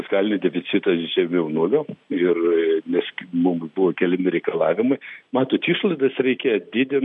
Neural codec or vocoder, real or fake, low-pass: none; real; 10.8 kHz